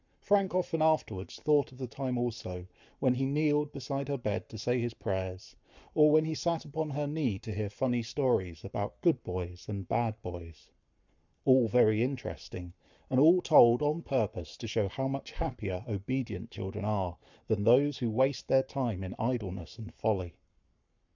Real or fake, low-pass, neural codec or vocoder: fake; 7.2 kHz; codec, 44.1 kHz, 7.8 kbps, Pupu-Codec